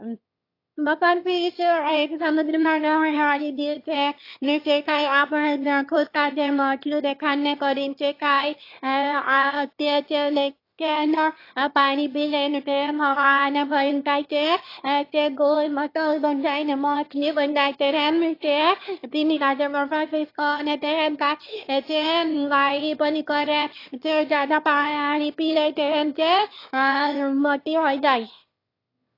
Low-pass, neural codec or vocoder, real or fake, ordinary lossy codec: 5.4 kHz; autoencoder, 22.05 kHz, a latent of 192 numbers a frame, VITS, trained on one speaker; fake; AAC, 32 kbps